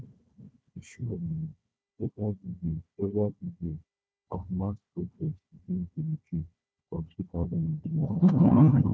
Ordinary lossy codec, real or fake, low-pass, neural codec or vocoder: none; fake; none; codec, 16 kHz, 1 kbps, FunCodec, trained on Chinese and English, 50 frames a second